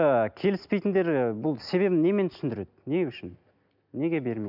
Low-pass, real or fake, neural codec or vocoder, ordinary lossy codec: 5.4 kHz; real; none; none